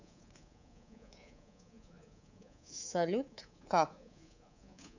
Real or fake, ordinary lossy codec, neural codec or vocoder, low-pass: fake; none; codec, 24 kHz, 3.1 kbps, DualCodec; 7.2 kHz